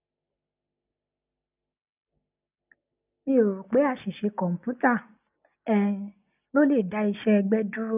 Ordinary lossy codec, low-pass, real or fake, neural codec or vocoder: none; 3.6 kHz; real; none